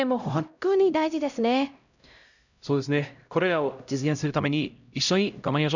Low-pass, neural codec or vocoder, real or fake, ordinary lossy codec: 7.2 kHz; codec, 16 kHz, 0.5 kbps, X-Codec, HuBERT features, trained on LibriSpeech; fake; none